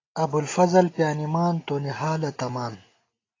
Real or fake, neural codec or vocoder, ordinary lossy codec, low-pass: real; none; AAC, 32 kbps; 7.2 kHz